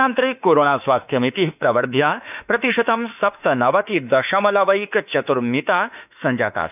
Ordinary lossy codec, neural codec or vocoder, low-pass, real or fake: none; autoencoder, 48 kHz, 32 numbers a frame, DAC-VAE, trained on Japanese speech; 3.6 kHz; fake